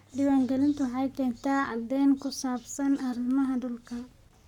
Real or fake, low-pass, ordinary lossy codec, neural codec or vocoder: fake; 19.8 kHz; none; codec, 44.1 kHz, 7.8 kbps, Pupu-Codec